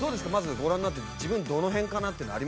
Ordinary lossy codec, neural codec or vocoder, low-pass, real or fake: none; none; none; real